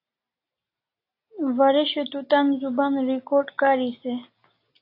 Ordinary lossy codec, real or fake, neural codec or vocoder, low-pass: AAC, 32 kbps; real; none; 5.4 kHz